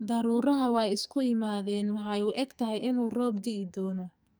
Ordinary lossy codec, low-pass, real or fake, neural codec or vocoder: none; none; fake; codec, 44.1 kHz, 2.6 kbps, SNAC